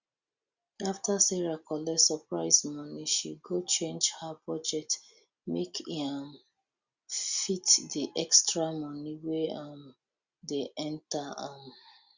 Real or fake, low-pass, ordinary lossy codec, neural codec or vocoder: real; 7.2 kHz; Opus, 64 kbps; none